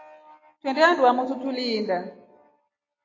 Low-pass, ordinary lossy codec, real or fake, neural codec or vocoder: 7.2 kHz; AAC, 32 kbps; real; none